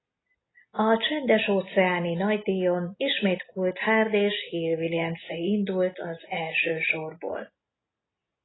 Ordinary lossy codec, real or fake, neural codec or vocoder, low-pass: AAC, 16 kbps; real; none; 7.2 kHz